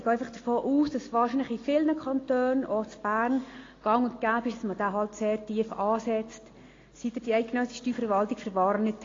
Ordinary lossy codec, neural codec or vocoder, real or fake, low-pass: AAC, 32 kbps; none; real; 7.2 kHz